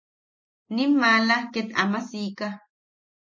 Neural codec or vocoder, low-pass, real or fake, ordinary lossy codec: none; 7.2 kHz; real; MP3, 32 kbps